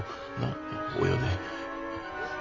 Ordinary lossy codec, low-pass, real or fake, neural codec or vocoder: AAC, 32 kbps; 7.2 kHz; real; none